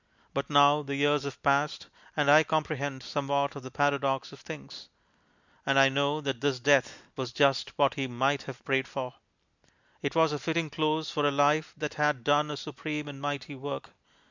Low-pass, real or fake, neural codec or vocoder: 7.2 kHz; real; none